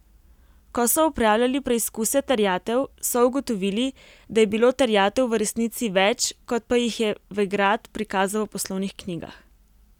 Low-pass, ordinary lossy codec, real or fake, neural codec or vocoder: 19.8 kHz; none; real; none